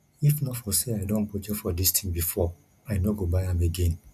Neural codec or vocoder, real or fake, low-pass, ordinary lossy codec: none; real; 14.4 kHz; none